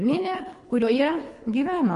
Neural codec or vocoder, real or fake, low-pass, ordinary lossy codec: codec, 24 kHz, 3 kbps, HILCodec; fake; 10.8 kHz; MP3, 48 kbps